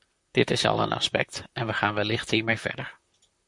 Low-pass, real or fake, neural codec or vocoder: 10.8 kHz; fake; vocoder, 44.1 kHz, 128 mel bands, Pupu-Vocoder